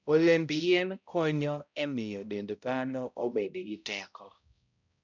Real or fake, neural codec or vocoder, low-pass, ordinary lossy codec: fake; codec, 16 kHz, 0.5 kbps, X-Codec, HuBERT features, trained on balanced general audio; 7.2 kHz; none